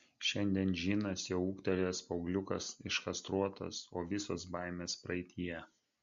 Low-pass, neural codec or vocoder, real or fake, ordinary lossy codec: 7.2 kHz; none; real; MP3, 48 kbps